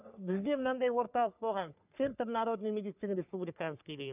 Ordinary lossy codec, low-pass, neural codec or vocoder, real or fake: none; 3.6 kHz; codec, 44.1 kHz, 3.4 kbps, Pupu-Codec; fake